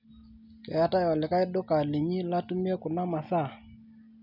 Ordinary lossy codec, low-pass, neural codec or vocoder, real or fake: none; 5.4 kHz; none; real